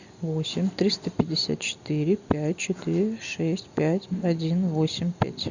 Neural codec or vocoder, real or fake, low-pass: none; real; 7.2 kHz